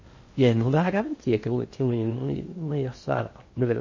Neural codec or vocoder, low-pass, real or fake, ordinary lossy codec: codec, 16 kHz in and 24 kHz out, 0.6 kbps, FocalCodec, streaming, 4096 codes; 7.2 kHz; fake; MP3, 32 kbps